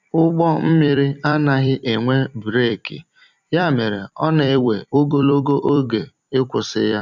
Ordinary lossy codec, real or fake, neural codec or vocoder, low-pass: none; fake; vocoder, 44.1 kHz, 128 mel bands every 256 samples, BigVGAN v2; 7.2 kHz